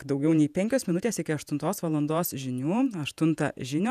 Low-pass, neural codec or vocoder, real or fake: 14.4 kHz; vocoder, 48 kHz, 128 mel bands, Vocos; fake